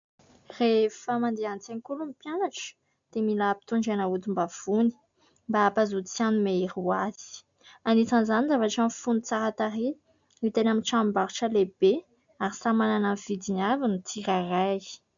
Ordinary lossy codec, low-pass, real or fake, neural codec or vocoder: MP3, 64 kbps; 7.2 kHz; real; none